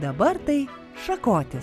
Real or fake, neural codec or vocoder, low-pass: real; none; 14.4 kHz